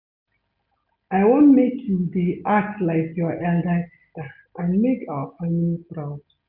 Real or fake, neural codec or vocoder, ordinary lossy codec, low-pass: real; none; none; 5.4 kHz